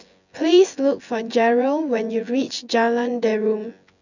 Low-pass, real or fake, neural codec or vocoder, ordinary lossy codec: 7.2 kHz; fake; vocoder, 24 kHz, 100 mel bands, Vocos; none